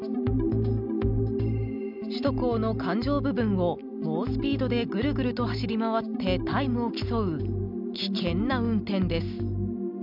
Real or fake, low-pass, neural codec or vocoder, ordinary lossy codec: real; 5.4 kHz; none; none